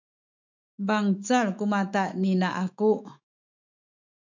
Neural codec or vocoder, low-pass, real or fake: autoencoder, 48 kHz, 128 numbers a frame, DAC-VAE, trained on Japanese speech; 7.2 kHz; fake